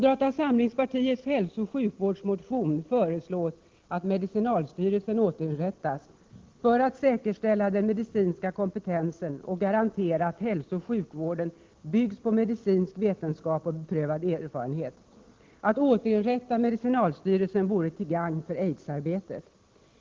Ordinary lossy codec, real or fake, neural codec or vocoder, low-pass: Opus, 16 kbps; real; none; 7.2 kHz